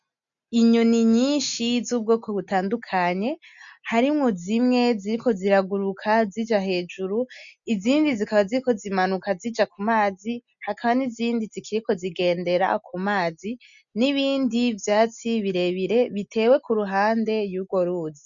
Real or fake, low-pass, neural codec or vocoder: real; 7.2 kHz; none